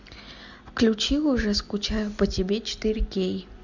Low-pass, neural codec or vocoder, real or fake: 7.2 kHz; none; real